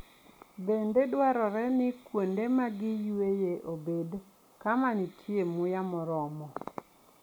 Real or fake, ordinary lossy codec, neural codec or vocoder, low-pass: real; none; none; none